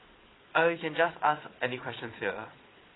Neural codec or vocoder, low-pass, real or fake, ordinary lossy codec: vocoder, 22.05 kHz, 80 mel bands, Vocos; 7.2 kHz; fake; AAC, 16 kbps